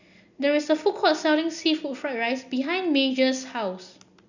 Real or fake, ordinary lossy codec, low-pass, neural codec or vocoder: real; none; 7.2 kHz; none